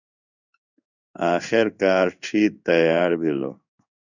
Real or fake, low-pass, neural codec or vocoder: fake; 7.2 kHz; codec, 16 kHz in and 24 kHz out, 1 kbps, XY-Tokenizer